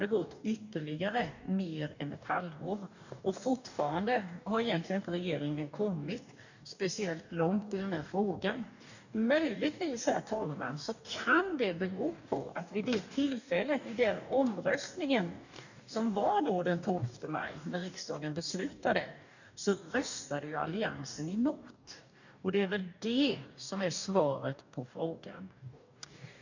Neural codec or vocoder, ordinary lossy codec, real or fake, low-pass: codec, 44.1 kHz, 2.6 kbps, DAC; none; fake; 7.2 kHz